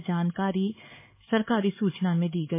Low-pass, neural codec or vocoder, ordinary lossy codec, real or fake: 3.6 kHz; codec, 16 kHz, 4 kbps, X-Codec, HuBERT features, trained on LibriSpeech; MP3, 16 kbps; fake